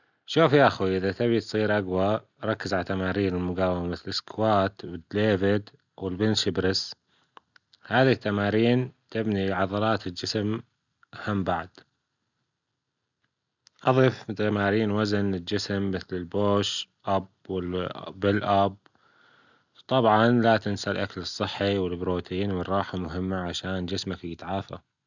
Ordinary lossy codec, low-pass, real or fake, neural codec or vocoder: none; 7.2 kHz; real; none